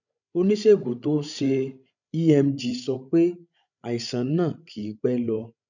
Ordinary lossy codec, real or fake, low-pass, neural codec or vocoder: none; fake; 7.2 kHz; codec, 16 kHz, 16 kbps, FreqCodec, larger model